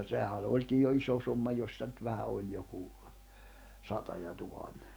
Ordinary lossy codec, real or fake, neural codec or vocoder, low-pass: none; fake; codec, 44.1 kHz, 7.8 kbps, DAC; none